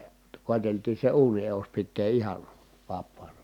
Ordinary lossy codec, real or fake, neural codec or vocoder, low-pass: none; real; none; 19.8 kHz